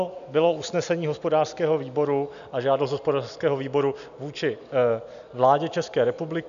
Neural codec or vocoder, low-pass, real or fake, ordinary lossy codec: none; 7.2 kHz; real; AAC, 96 kbps